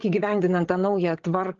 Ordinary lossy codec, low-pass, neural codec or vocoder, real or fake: Opus, 16 kbps; 7.2 kHz; codec, 16 kHz, 8 kbps, FreqCodec, larger model; fake